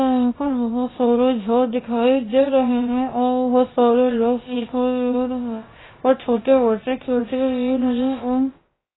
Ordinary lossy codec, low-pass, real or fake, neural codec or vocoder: AAC, 16 kbps; 7.2 kHz; fake; codec, 16 kHz, about 1 kbps, DyCAST, with the encoder's durations